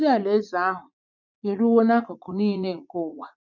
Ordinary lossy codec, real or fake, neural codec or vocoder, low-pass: none; fake; vocoder, 44.1 kHz, 80 mel bands, Vocos; 7.2 kHz